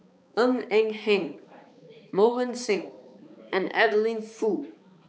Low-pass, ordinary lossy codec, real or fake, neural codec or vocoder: none; none; fake; codec, 16 kHz, 4 kbps, X-Codec, HuBERT features, trained on balanced general audio